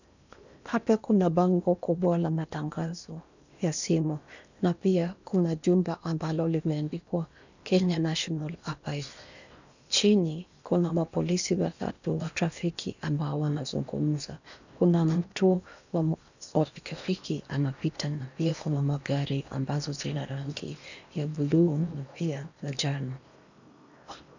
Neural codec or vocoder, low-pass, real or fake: codec, 16 kHz in and 24 kHz out, 0.8 kbps, FocalCodec, streaming, 65536 codes; 7.2 kHz; fake